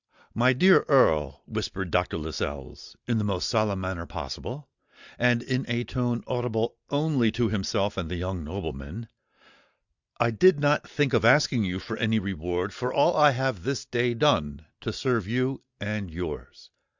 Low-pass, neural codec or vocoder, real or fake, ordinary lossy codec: 7.2 kHz; none; real; Opus, 64 kbps